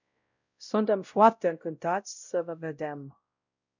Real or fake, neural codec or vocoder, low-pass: fake; codec, 16 kHz, 0.5 kbps, X-Codec, WavLM features, trained on Multilingual LibriSpeech; 7.2 kHz